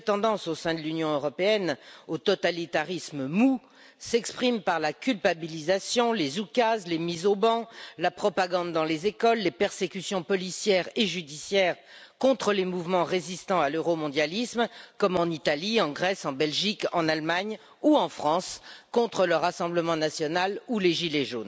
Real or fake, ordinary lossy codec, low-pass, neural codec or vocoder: real; none; none; none